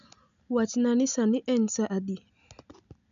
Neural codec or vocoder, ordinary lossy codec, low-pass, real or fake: none; none; 7.2 kHz; real